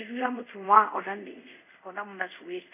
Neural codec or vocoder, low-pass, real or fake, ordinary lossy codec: codec, 24 kHz, 0.5 kbps, DualCodec; 3.6 kHz; fake; none